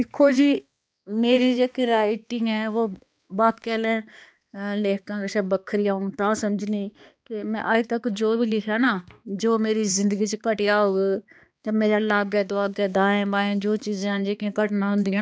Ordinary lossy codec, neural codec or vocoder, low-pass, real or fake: none; codec, 16 kHz, 2 kbps, X-Codec, HuBERT features, trained on balanced general audio; none; fake